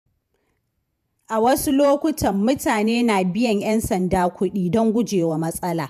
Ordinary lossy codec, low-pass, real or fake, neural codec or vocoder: none; 14.4 kHz; fake; vocoder, 48 kHz, 128 mel bands, Vocos